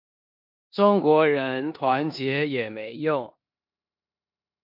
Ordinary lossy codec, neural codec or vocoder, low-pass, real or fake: MP3, 48 kbps; codec, 16 kHz in and 24 kHz out, 0.9 kbps, LongCat-Audio-Codec, four codebook decoder; 5.4 kHz; fake